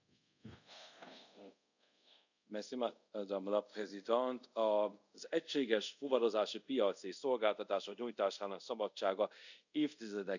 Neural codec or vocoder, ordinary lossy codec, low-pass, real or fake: codec, 24 kHz, 0.5 kbps, DualCodec; none; 7.2 kHz; fake